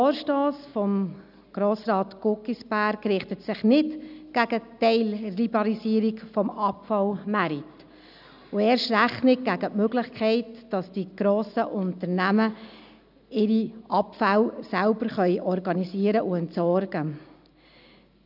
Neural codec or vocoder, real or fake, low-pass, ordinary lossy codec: none; real; 5.4 kHz; none